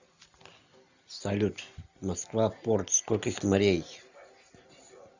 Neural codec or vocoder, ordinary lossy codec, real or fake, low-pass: none; Opus, 64 kbps; real; 7.2 kHz